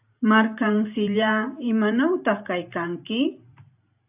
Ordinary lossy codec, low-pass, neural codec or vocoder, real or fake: AAC, 32 kbps; 3.6 kHz; vocoder, 44.1 kHz, 128 mel bands every 512 samples, BigVGAN v2; fake